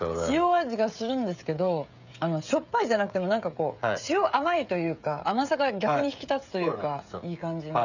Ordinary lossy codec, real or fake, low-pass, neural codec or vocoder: none; fake; 7.2 kHz; codec, 16 kHz, 16 kbps, FreqCodec, smaller model